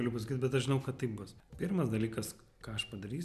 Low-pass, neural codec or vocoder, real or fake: 14.4 kHz; none; real